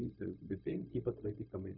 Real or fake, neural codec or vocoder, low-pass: fake; codec, 16 kHz, 0.4 kbps, LongCat-Audio-Codec; 5.4 kHz